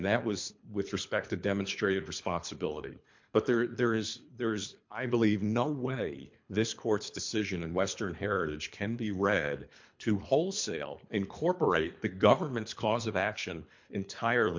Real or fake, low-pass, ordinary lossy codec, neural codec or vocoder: fake; 7.2 kHz; MP3, 48 kbps; codec, 24 kHz, 3 kbps, HILCodec